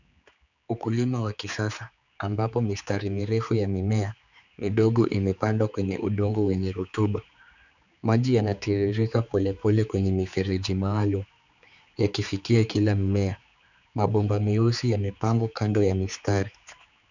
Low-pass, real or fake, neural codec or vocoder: 7.2 kHz; fake; codec, 16 kHz, 4 kbps, X-Codec, HuBERT features, trained on general audio